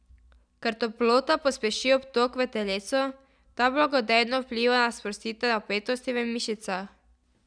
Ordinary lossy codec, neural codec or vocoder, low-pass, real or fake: none; none; 9.9 kHz; real